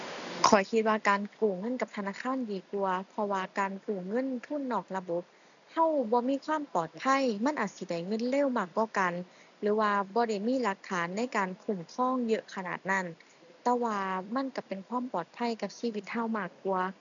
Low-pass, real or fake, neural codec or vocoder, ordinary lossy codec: 7.2 kHz; real; none; none